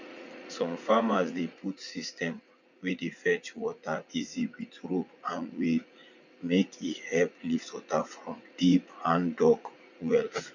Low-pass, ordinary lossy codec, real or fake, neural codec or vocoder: 7.2 kHz; none; fake; vocoder, 44.1 kHz, 80 mel bands, Vocos